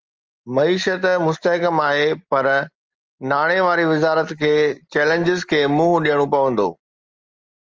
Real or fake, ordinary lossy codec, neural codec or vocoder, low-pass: real; Opus, 24 kbps; none; 7.2 kHz